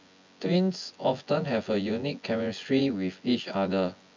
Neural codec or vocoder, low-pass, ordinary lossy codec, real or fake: vocoder, 24 kHz, 100 mel bands, Vocos; 7.2 kHz; MP3, 64 kbps; fake